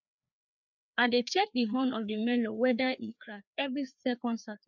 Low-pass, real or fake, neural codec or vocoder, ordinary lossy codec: 7.2 kHz; fake; codec, 16 kHz, 2 kbps, FreqCodec, larger model; none